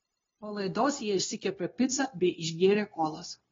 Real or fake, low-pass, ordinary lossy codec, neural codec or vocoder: fake; 7.2 kHz; AAC, 24 kbps; codec, 16 kHz, 0.9 kbps, LongCat-Audio-Codec